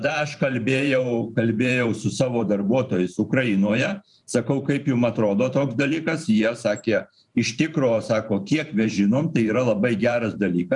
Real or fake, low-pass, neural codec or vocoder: fake; 10.8 kHz; vocoder, 44.1 kHz, 128 mel bands every 512 samples, BigVGAN v2